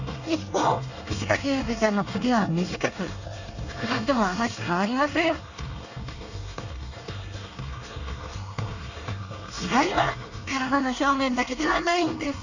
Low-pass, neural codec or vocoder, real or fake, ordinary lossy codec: 7.2 kHz; codec, 24 kHz, 1 kbps, SNAC; fake; none